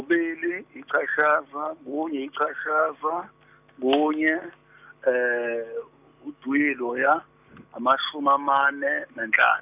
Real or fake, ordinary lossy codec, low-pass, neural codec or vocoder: real; none; 3.6 kHz; none